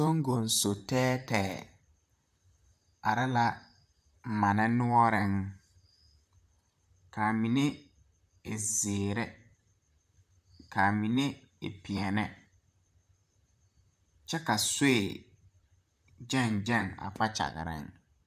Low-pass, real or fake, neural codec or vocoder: 14.4 kHz; fake; vocoder, 44.1 kHz, 128 mel bands, Pupu-Vocoder